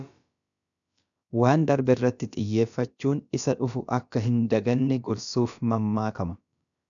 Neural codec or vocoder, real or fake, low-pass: codec, 16 kHz, about 1 kbps, DyCAST, with the encoder's durations; fake; 7.2 kHz